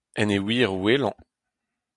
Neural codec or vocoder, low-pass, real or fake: none; 10.8 kHz; real